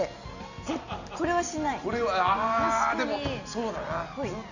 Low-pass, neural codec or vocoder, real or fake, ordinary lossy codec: 7.2 kHz; none; real; none